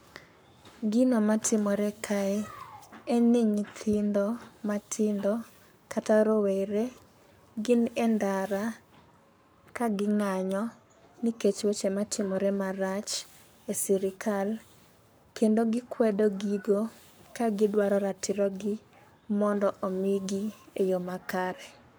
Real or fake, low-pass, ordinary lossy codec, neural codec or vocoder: fake; none; none; codec, 44.1 kHz, 7.8 kbps, Pupu-Codec